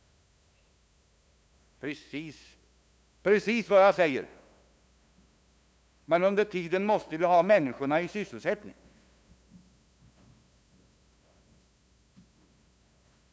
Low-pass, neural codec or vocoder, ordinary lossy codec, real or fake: none; codec, 16 kHz, 2 kbps, FunCodec, trained on LibriTTS, 25 frames a second; none; fake